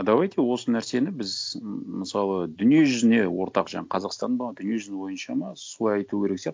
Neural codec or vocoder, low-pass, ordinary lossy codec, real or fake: none; none; none; real